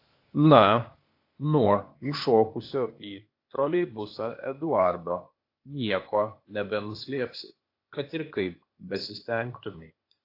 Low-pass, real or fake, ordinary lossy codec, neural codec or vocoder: 5.4 kHz; fake; AAC, 32 kbps; codec, 16 kHz, 0.8 kbps, ZipCodec